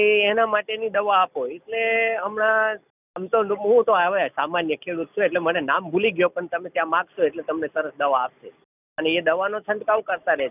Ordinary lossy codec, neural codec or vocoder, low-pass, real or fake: none; none; 3.6 kHz; real